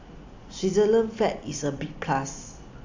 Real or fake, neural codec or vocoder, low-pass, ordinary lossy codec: real; none; 7.2 kHz; AAC, 48 kbps